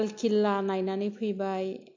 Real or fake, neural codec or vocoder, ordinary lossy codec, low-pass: real; none; MP3, 48 kbps; 7.2 kHz